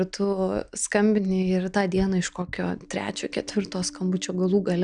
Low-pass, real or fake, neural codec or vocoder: 9.9 kHz; real; none